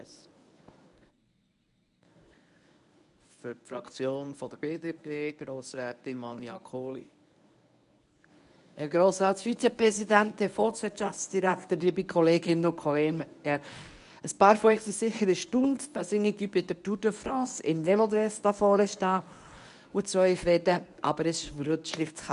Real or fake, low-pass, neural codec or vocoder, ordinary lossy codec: fake; 10.8 kHz; codec, 24 kHz, 0.9 kbps, WavTokenizer, medium speech release version 1; none